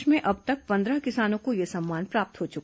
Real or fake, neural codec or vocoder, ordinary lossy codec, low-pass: real; none; none; none